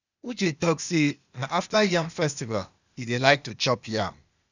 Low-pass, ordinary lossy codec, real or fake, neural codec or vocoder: 7.2 kHz; none; fake; codec, 16 kHz, 0.8 kbps, ZipCodec